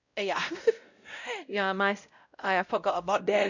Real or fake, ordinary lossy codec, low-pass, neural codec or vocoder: fake; none; 7.2 kHz; codec, 16 kHz, 0.5 kbps, X-Codec, WavLM features, trained on Multilingual LibriSpeech